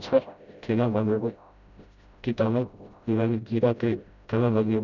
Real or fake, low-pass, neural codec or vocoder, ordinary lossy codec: fake; 7.2 kHz; codec, 16 kHz, 0.5 kbps, FreqCodec, smaller model; none